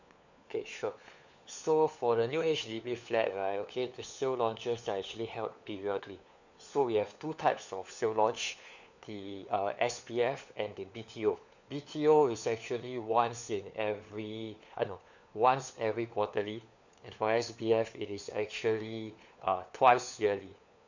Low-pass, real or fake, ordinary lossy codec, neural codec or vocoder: 7.2 kHz; fake; none; codec, 16 kHz, 4 kbps, FunCodec, trained on LibriTTS, 50 frames a second